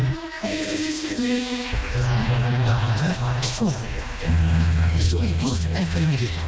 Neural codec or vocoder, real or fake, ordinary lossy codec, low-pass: codec, 16 kHz, 1 kbps, FreqCodec, smaller model; fake; none; none